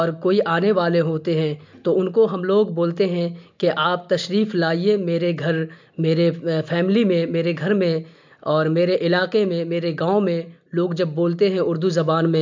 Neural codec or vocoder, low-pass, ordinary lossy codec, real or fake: none; 7.2 kHz; MP3, 64 kbps; real